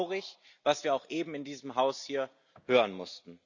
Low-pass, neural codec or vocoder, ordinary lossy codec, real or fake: 7.2 kHz; none; none; real